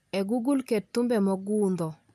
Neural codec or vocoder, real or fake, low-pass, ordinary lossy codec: none; real; 14.4 kHz; none